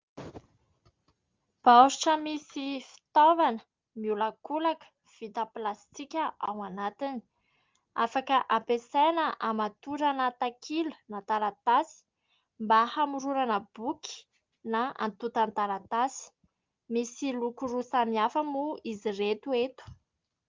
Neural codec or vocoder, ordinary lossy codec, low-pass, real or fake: none; Opus, 32 kbps; 7.2 kHz; real